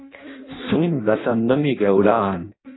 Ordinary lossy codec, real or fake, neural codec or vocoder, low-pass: AAC, 16 kbps; fake; codec, 16 kHz in and 24 kHz out, 0.6 kbps, FireRedTTS-2 codec; 7.2 kHz